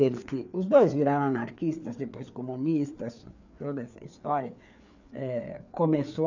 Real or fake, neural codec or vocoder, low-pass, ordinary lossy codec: fake; codec, 16 kHz, 4 kbps, FreqCodec, larger model; 7.2 kHz; none